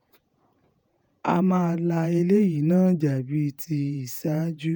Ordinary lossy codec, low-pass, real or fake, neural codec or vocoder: none; 19.8 kHz; fake; vocoder, 48 kHz, 128 mel bands, Vocos